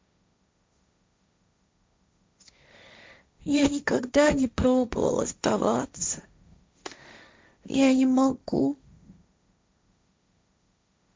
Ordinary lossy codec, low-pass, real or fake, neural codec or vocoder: none; none; fake; codec, 16 kHz, 1.1 kbps, Voila-Tokenizer